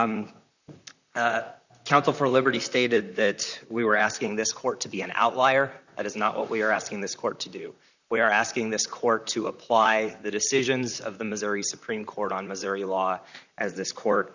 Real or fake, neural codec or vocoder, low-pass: fake; vocoder, 44.1 kHz, 128 mel bands, Pupu-Vocoder; 7.2 kHz